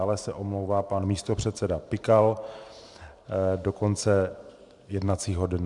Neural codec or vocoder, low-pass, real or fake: vocoder, 44.1 kHz, 128 mel bands every 512 samples, BigVGAN v2; 10.8 kHz; fake